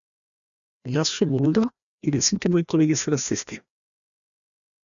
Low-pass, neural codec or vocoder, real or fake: 7.2 kHz; codec, 16 kHz, 1 kbps, FreqCodec, larger model; fake